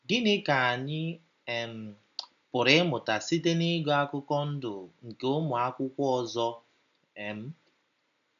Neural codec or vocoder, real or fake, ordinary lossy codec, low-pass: none; real; none; 7.2 kHz